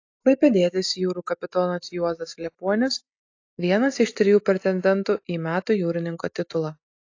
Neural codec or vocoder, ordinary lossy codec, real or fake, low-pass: none; AAC, 48 kbps; real; 7.2 kHz